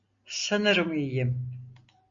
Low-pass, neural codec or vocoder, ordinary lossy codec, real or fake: 7.2 kHz; none; MP3, 64 kbps; real